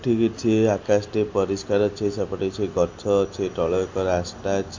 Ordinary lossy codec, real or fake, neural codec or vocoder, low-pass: MP3, 48 kbps; real; none; 7.2 kHz